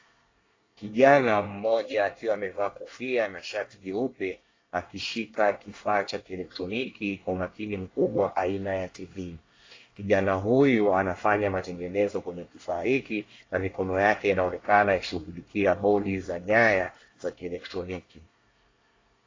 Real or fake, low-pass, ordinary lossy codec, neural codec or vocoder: fake; 7.2 kHz; AAC, 32 kbps; codec, 24 kHz, 1 kbps, SNAC